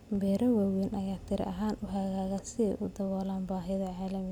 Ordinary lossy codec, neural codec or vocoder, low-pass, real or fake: none; none; 19.8 kHz; real